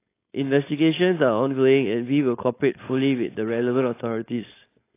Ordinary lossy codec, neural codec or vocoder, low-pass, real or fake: AAC, 24 kbps; codec, 16 kHz, 4.8 kbps, FACodec; 3.6 kHz; fake